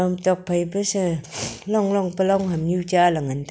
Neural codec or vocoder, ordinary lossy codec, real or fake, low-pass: none; none; real; none